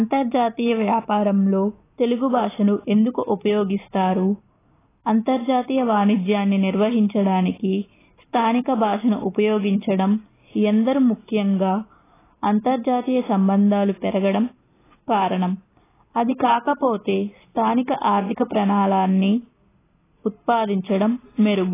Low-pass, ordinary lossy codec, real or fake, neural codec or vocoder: 3.6 kHz; AAC, 16 kbps; real; none